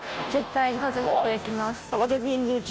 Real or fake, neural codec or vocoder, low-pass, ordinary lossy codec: fake; codec, 16 kHz, 0.5 kbps, FunCodec, trained on Chinese and English, 25 frames a second; none; none